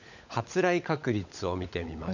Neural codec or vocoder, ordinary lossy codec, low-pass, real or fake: codec, 16 kHz, 16 kbps, FunCodec, trained on LibriTTS, 50 frames a second; none; 7.2 kHz; fake